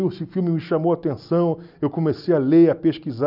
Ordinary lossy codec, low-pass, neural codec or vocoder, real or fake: none; 5.4 kHz; none; real